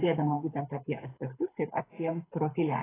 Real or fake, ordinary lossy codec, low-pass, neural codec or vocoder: fake; AAC, 16 kbps; 3.6 kHz; codec, 24 kHz, 6 kbps, HILCodec